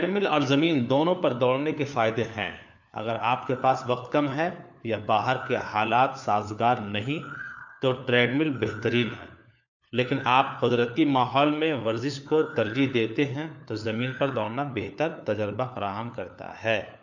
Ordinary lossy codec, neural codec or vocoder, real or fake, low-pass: none; codec, 16 kHz, 4 kbps, FunCodec, trained on LibriTTS, 50 frames a second; fake; 7.2 kHz